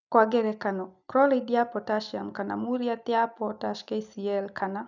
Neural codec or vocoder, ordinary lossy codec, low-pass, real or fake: none; none; 7.2 kHz; real